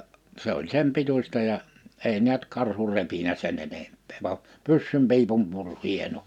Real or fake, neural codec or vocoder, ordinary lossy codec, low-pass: real; none; none; 19.8 kHz